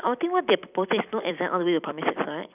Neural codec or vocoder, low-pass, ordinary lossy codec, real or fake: vocoder, 44.1 kHz, 128 mel bands every 256 samples, BigVGAN v2; 3.6 kHz; none; fake